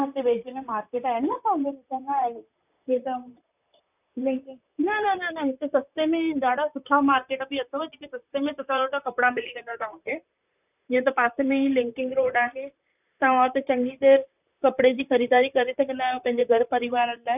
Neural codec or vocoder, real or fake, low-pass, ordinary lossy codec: vocoder, 44.1 kHz, 128 mel bands, Pupu-Vocoder; fake; 3.6 kHz; none